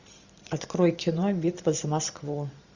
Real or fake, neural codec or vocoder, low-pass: fake; vocoder, 44.1 kHz, 128 mel bands every 512 samples, BigVGAN v2; 7.2 kHz